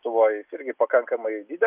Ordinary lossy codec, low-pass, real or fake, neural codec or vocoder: Opus, 64 kbps; 3.6 kHz; real; none